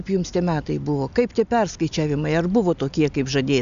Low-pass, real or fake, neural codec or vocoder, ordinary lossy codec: 7.2 kHz; real; none; AAC, 96 kbps